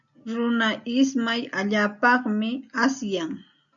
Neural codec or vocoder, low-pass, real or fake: none; 7.2 kHz; real